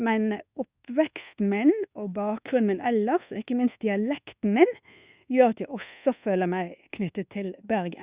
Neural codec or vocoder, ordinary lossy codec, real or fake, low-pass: codec, 24 kHz, 1.2 kbps, DualCodec; Opus, 64 kbps; fake; 3.6 kHz